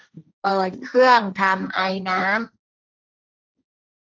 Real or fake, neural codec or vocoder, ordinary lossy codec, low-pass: fake; codec, 16 kHz, 1.1 kbps, Voila-Tokenizer; none; none